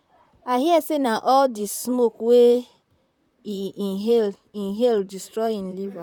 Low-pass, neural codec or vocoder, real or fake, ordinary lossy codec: 19.8 kHz; vocoder, 44.1 kHz, 128 mel bands, Pupu-Vocoder; fake; none